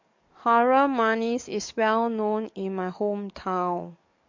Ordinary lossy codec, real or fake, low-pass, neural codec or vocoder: MP3, 48 kbps; fake; 7.2 kHz; codec, 44.1 kHz, 7.8 kbps, DAC